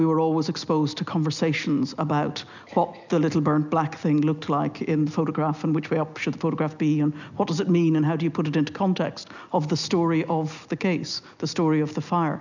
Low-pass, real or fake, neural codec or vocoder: 7.2 kHz; real; none